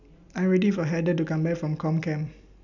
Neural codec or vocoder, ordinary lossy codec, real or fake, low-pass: none; none; real; 7.2 kHz